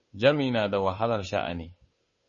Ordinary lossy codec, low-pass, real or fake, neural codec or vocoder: MP3, 32 kbps; 7.2 kHz; fake; codec, 16 kHz, 2 kbps, FunCodec, trained on Chinese and English, 25 frames a second